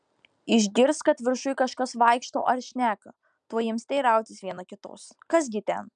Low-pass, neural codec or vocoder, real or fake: 9.9 kHz; none; real